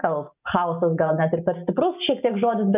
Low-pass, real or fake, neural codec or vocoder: 3.6 kHz; real; none